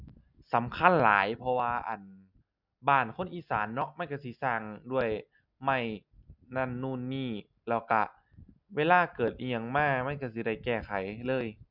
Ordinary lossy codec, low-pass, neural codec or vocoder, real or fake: none; 5.4 kHz; none; real